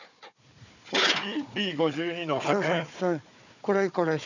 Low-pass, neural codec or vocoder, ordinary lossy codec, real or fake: 7.2 kHz; codec, 16 kHz, 4 kbps, FunCodec, trained on Chinese and English, 50 frames a second; none; fake